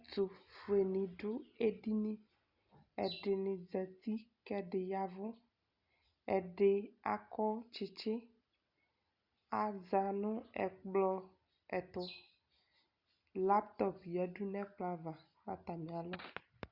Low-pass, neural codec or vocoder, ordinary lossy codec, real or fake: 5.4 kHz; none; Opus, 64 kbps; real